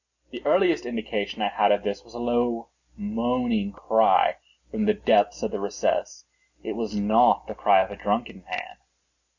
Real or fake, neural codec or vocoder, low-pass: real; none; 7.2 kHz